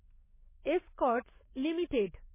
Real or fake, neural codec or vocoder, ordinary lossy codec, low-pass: fake; codec, 44.1 kHz, 7.8 kbps, DAC; MP3, 16 kbps; 3.6 kHz